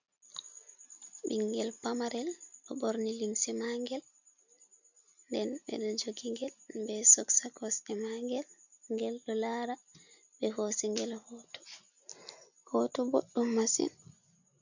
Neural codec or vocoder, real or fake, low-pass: none; real; 7.2 kHz